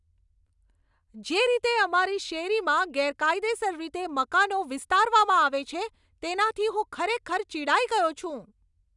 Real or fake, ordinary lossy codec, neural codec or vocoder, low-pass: real; none; none; 10.8 kHz